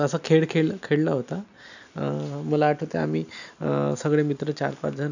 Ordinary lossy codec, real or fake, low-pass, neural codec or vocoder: none; real; 7.2 kHz; none